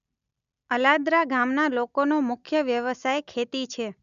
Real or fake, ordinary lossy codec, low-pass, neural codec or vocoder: real; none; 7.2 kHz; none